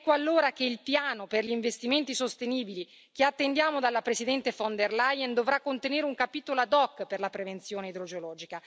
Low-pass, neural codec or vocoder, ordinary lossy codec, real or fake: none; none; none; real